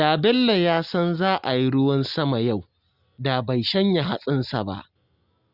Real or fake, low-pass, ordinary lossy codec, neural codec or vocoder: real; 5.4 kHz; Opus, 64 kbps; none